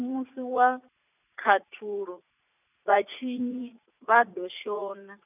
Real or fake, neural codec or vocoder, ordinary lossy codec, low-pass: fake; vocoder, 44.1 kHz, 80 mel bands, Vocos; none; 3.6 kHz